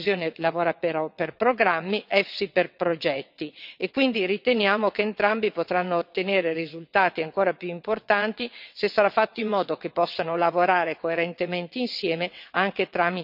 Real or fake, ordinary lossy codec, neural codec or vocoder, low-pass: fake; none; vocoder, 22.05 kHz, 80 mel bands, WaveNeXt; 5.4 kHz